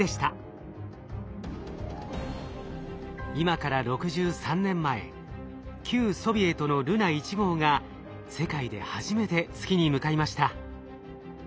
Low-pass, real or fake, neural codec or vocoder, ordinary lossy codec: none; real; none; none